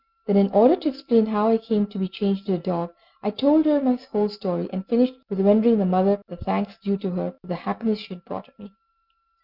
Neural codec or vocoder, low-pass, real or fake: none; 5.4 kHz; real